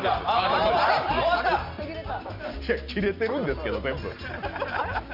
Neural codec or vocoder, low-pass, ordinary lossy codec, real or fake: none; 5.4 kHz; Opus, 64 kbps; real